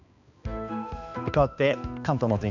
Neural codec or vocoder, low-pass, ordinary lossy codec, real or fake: codec, 16 kHz, 2 kbps, X-Codec, HuBERT features, trained on balanced general audio; 7.2 kHz; none; fake